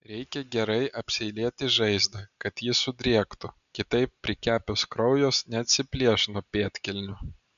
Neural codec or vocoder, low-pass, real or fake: none; 7.2 kHz; real